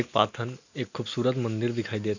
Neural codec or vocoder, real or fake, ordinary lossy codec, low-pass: none; real; none; 7.2 kHz